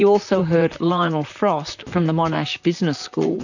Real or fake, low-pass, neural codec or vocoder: fake; 7.2 kHz; vocoder, 44.1 kHz, 128 mel bands, Pupu-Vocoder